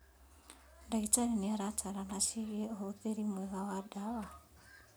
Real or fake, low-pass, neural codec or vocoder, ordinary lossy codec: real; none; none; none